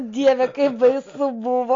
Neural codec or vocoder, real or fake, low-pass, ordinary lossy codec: none; real; 7.2 kHz; AAC, 48 kbps